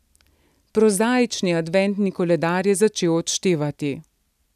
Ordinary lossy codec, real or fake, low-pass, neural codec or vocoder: none; real; 14.4 kHz; none